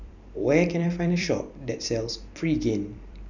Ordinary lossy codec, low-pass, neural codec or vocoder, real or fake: none; 7.2 kHz; vocoder, 44.1 kHz, 128 mel bands every 256 samples, BigVGAN v2; fake